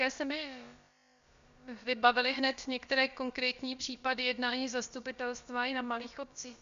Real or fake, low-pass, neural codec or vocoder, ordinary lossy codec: fake; 7.2 kHz; codec, 16 kHz, about 1 kbps, DyCAST, with the encoder's durations; Opus, 64 kbps